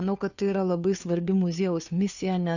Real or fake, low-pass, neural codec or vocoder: fake; 7.2 kHz; codec, 16 kHz, 2 kbps, FunCodec, trained on Chinese and English, 25 frames a second